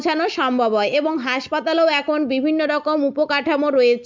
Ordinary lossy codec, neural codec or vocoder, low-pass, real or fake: MP3, 48 kbps; none; 7.2 kHz; real